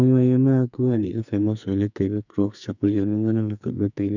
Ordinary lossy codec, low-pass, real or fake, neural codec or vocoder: none; 7.2 kHz; fake; codec, 44.1 kHz, 2.6 kbps, SNAC